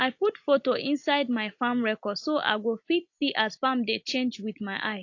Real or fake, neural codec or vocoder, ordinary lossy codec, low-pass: real; none; AAC, 48 kbps; 7.2 kHz